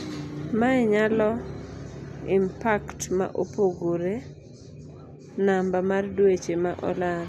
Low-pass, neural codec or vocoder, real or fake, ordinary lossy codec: 14.4 kHz; none; real; none